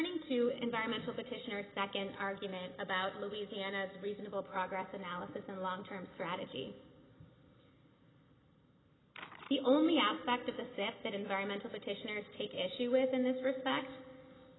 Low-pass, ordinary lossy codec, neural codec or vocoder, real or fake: 7.2 kHz; AAC, 16 kbps; none; real